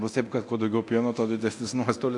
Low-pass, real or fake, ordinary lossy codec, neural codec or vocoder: 10.8 kHz; fake; AAC, 64 kbps; codec, 24 kHz, 0.9 kbps, DualCodec